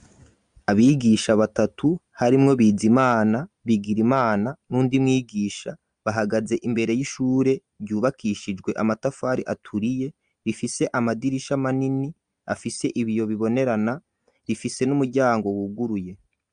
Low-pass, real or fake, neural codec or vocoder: 9.9 kHz; real; none